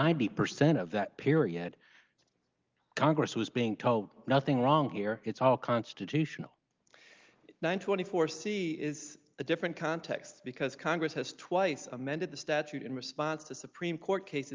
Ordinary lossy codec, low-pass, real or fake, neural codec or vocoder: Opus, 32 kbps; 7.2 kHz; real; none